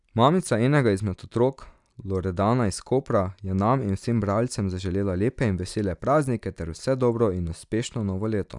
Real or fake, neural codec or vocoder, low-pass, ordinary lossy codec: real; none; 10.8 kHz; none